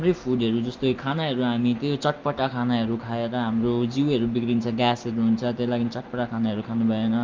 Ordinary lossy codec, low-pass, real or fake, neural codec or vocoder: Opus, 24 kbps; 7.2 kHz; real; none